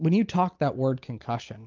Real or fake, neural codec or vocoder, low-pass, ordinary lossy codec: real; none; 7.2 kHz; Opus, 32 kbps